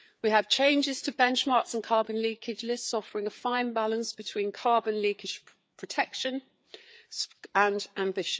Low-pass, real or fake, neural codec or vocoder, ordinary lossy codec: none; fake; codec, 16 kHz, 4 kbps, FreqCodec, larger model; none